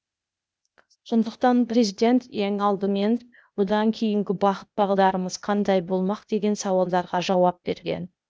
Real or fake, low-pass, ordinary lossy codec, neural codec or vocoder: fake; none; none; codec, 16 kHz, 0.8 kbps, ZipCodec